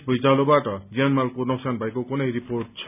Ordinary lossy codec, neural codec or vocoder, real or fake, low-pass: none; none; real; 3.6 kHz